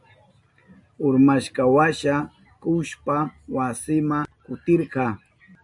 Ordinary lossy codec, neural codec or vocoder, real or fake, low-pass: MP3, 96 kbps; none; real; 10.8 kHz